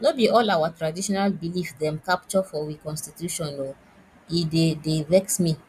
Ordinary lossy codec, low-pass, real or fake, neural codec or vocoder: none; 14.4 kHz; real; none